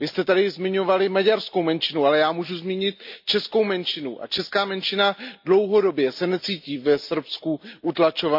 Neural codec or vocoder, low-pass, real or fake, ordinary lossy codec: none; 5.4 kHz; real; MP3, 48 kbps